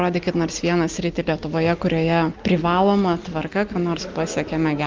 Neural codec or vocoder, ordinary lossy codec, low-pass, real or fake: none; Opus, 24 kbps; 7.2 kHz; real